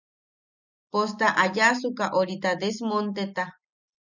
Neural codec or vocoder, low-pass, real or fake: none; 7.2 kHz; real